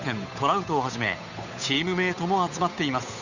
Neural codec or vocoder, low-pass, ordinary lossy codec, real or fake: codec, 16 kHz, 8 kbps, FunCodec, trained on Chinese and English, 25 frames a second; 7.2 kHz; none; fake